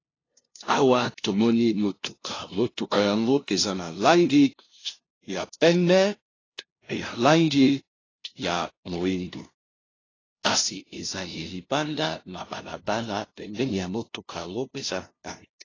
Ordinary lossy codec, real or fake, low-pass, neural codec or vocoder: AAC, 32 kbps; fake; 7.2 kHz; codec, 16 kHz, 0.5 kbps, FunCodec, trained on LibriTTS, 25 frames a second